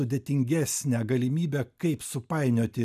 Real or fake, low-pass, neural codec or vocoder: real; 14.4 kHz; none